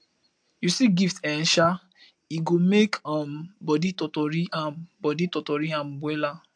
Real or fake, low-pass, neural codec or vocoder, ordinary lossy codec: real; 9.9 kHz; none; AAC, 64 kbps